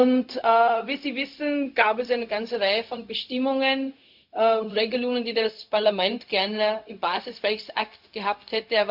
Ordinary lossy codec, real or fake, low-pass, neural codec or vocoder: MP3, 48 kbps; fake; 5.4 kHz; codec, 16 kHz, 0.4 kbps, LongCat-Audio-Codec